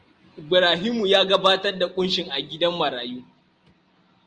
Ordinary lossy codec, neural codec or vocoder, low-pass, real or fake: Opus, 32 kbps; none; 9.9 kHz; real